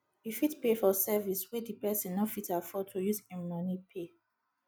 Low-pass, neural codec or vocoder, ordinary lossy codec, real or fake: none; none; none; real